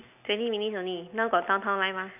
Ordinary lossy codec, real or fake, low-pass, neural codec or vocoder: none; real; 3.6 kHz; none